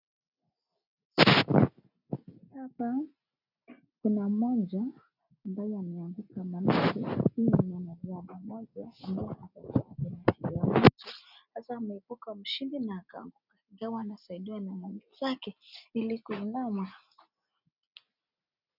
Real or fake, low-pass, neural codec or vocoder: real; 5.4 kHz; none